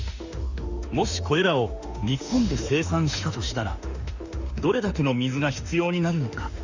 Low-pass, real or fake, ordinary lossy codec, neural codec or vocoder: 7.2 kHz; fake; Opus, 64 kbps; autoencoder, 48 kHz, 32 numbers a frame, DAC-VAE, trained on Japanese speech